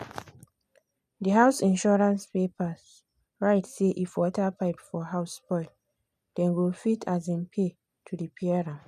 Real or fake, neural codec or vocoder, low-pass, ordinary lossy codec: real; none; 14.4 kHz; none